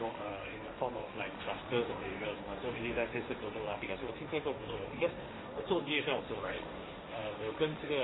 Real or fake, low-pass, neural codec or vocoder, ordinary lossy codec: fake; 7.2 kHz; codec, 16 kHz, 1.1 kbps, Voila-Tokenizer; AAC, 16 kbps